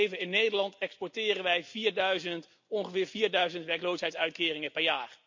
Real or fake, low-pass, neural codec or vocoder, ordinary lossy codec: real; 7.2 kHz; none; MP3, 48 kbps